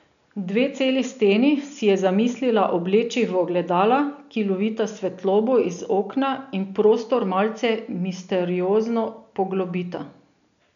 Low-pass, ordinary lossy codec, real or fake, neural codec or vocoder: 7.2 kHz; none; real; none